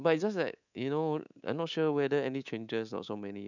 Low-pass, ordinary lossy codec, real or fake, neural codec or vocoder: 7.2 kHz; none; fake; codec, 24 kHz, 3.1 kbps, DualCodec